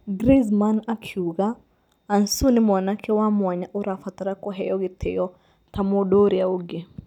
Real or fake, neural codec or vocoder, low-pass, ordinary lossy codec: real; none; 19.8 kHz; none